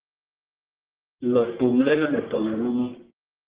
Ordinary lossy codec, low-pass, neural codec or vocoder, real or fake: Opus, 16 kbps; 3.6 kHz; codec, 44.1 kHz, 1.7 kbps, Pupu-Codec; fake